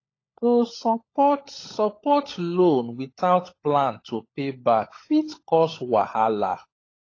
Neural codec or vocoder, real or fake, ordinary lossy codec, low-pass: codec, 16 kHz, 16 kbps, FunCodec, trained on LibriTTS, 50 frames a second; fake; AAC, 32 kbps; 7.2 kHz